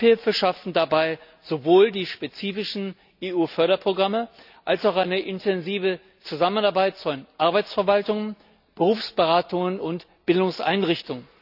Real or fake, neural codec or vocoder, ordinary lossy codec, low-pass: real; none; none; 5.4 kHz